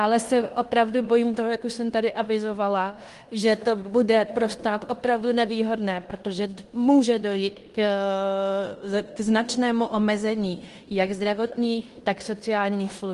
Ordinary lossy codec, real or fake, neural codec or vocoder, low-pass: Opus, 24 kbps; fake; codec, 16 kHz in and 24 kHz out, 0.9 kbps, LongCat-Audio-Codec, fine tuned four codebook decoder; 10.8 kHz